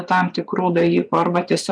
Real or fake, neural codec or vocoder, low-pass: fake; vocoder, 24 kHz, 100 mel bands, Vocos; 9.9 kHz